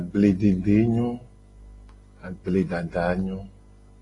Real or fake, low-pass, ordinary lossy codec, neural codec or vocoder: real; 10.8 kHz; AAC, 32 kbps; none